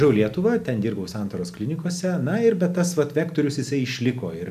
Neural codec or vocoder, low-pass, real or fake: none; 14.4 kHz; real